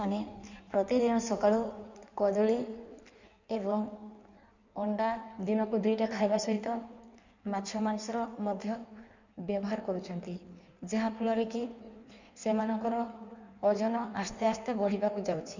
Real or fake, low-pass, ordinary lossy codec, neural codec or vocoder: fake; 7.2 kHz; none; codec, 16 kHz in and 24 kHz out, 1.1 kbps, FireRedTTS-2 codec